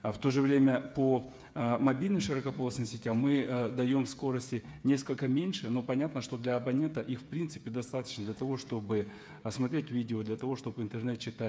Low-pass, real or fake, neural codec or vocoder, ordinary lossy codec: none; fake; codec, 16 kHz, 8 kbps, FreqCodec, smaller model; none